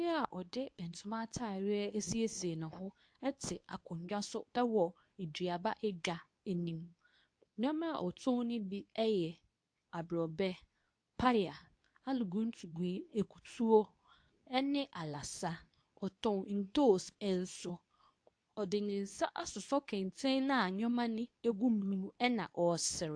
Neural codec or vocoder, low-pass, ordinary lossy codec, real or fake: codec, 24 kHz, 0.9 kbps, WavTokenizer, medium speech release version 2; 9.9 kHz; AAC, 64 kbps; fake